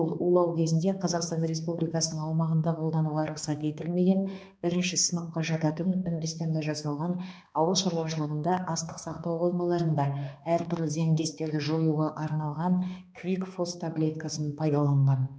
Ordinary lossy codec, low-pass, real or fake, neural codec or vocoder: none; none; fake; codec, 16 kHz, 2 kbps, X-Codec, HuBERT features, trained on balanced general audio